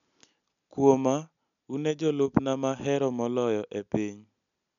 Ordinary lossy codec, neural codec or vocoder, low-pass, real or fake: none; none; 7.2 kHz; real